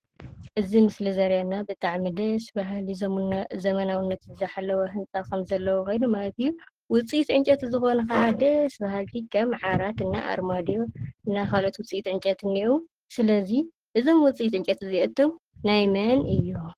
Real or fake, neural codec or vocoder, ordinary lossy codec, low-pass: fake; codec, 44.1 kHz, 7.8 kbps, Pupu-Codec; Opus, 16 kbps; 14.4 kHz